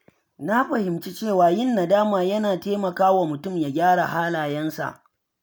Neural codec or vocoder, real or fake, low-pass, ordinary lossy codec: none; real; none; none